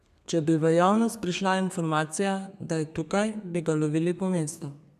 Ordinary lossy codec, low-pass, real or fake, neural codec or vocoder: none; 14.4 kHz; fake; codec, 32 kHz, 1.9 kbps, SNAC